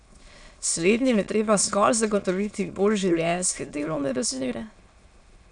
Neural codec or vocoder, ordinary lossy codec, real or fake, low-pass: autoencoder, 22.05 kHz, a latent of 192 numbers a frame, VITS, trained on many speakers; none; fake; 9.9 kHz